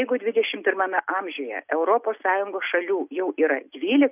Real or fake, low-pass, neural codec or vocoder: real; 3.6 kHz; none